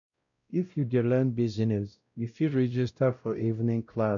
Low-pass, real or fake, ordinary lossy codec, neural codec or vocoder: 7.2 kHz; fake; none; codec, 16 kHz, 0.5 kbps, X-Codec, WavLM features, trained on Multilingual LibriSpeech